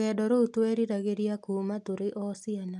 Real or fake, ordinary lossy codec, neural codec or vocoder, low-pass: real; none; none; none